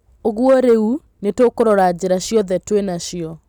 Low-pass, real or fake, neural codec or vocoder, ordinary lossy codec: 19.8 kHz; real; none; none